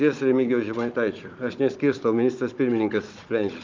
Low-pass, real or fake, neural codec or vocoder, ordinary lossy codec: 7.2 kHz; fake; codec, 16 kHz, 16 kbps, FunCodec, trained on Chinese and English, 50 frames a second; Opus, 32 kbps